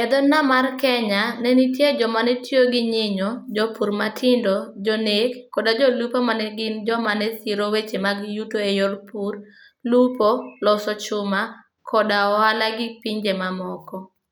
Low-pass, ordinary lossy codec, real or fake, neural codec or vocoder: none; none; real; none